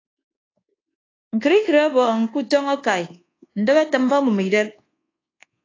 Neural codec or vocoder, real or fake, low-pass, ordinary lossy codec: codec, 24 kHz, 1.2 kbps, DualCodec; fake; 7.2 kHz; AAC, 32 kbps